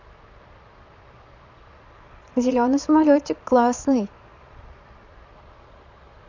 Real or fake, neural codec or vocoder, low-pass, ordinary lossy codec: fake; vocoder, 22.05 kHz, 80 mel bands, Vocos; 7.2 kHz; none